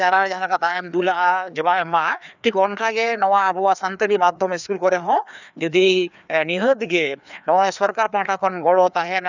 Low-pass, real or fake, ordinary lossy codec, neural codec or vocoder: 7.2 kHz; fake; none; codec, 16 kHz, 2 kbps, FreqCodec, larger model